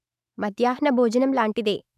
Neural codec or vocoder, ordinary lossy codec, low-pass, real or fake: autoencoder, 48 kHz, 128 numbers a frame, DAC-VAE, trained on Japanese speech; none; 14.4 kHz; fake